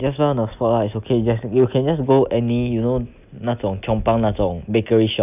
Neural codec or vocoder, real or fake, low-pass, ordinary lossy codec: none; real; 3.6 kHz; none